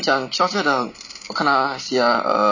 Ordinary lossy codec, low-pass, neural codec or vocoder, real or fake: none; 7.2 kHz; none; real